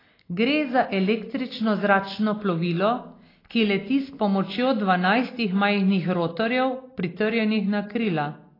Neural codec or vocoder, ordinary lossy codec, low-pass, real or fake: none; AAC, 24 kbps; 5.4 kHz; real